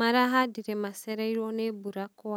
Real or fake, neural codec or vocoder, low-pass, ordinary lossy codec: real; none; none; none